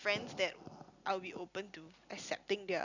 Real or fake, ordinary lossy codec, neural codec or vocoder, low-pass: real; none; none; 7.2 kHz